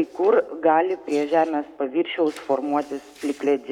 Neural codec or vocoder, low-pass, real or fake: codec, 44.1 kHz, 7.8 kbps, Pupu-Codec; 19.8 kHz; fake